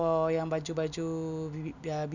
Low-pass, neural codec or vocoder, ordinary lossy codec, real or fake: 7.2 kHz; none; none; real